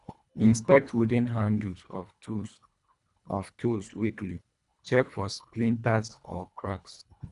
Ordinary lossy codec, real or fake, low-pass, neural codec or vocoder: none; fake; 10.8 kHz; codec, 24 kHz, 1.5 kbps, HILCodec